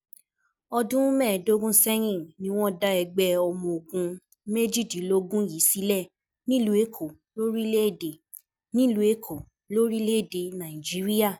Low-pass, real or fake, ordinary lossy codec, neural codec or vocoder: none; real; none; none